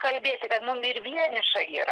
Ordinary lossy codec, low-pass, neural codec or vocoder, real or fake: Opus, 16 kbps; 10.8 kHz; none; real